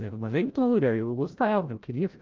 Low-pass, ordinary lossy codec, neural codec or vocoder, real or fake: 7.2 kHz; Opus, 32 kbps; codec, 16 kHz, 0.5 kbps, FreqCodec, larger model; fake